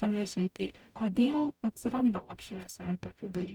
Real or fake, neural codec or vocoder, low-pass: fake; codec, 44.1 kHz, 0.9 kbps, DAC; 19.8 kHz